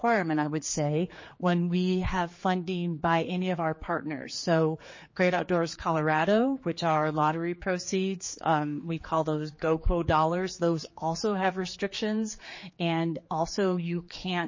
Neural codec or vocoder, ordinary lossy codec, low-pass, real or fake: codec, 16 kHz, 4 kbps, X-Codec, HuBERT features, trained on general audio; MP3, 32 kbps; 7.2 kHz; fake